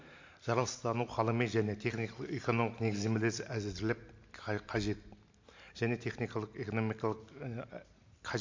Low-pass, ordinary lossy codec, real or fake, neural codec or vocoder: 7.2 kHz; MP3, 48 kbps; real; none